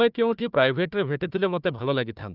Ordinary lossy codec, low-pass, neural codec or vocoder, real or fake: Opus, 24 kbps; 5.4 kHz; codec, 16 kHz, 1 kbps, FunCodec, trained on Chinese and English, 50 frames a second; fake